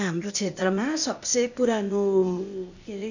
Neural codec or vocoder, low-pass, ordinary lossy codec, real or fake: codec, 16 kHz, 0.8 kbps, ZipCodec; 7.2 kHz; none; fake